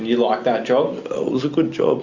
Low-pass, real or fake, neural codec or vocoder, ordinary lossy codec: 7.2 kHz; real; none; Opus, 64 kbps